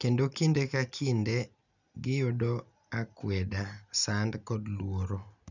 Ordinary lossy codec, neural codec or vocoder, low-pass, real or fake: none; none; 7.2 kHz; real